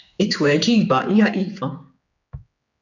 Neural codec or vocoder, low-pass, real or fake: codec, 16 kHz, 4 kbps, X-Codec, HuBERT features, trained on general audio; 7.2 kHz; fake